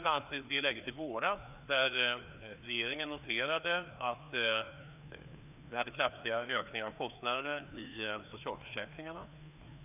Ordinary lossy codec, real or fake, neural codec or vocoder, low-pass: none; fake; codec, 16 kHz, 2 kbps, FreqCodec, larger model; 3.6 kHz